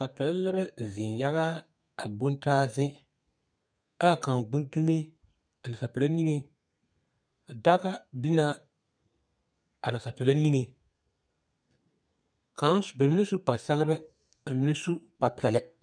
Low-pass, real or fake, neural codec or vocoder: 9.9 kHz; fake; codec, 32 kHz, 1.9 kbps, SNAC